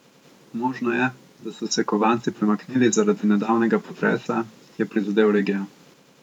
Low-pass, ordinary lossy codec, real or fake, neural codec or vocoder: 19.8 kHz; none; fake; vocoder, 44.1 kHz, 128 mel bands every 512 samples, BigVGAN v2